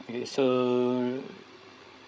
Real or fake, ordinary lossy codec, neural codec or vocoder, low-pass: fake; none; codec, 16 kHz, 16 kbps, FreqCodec, larger model; none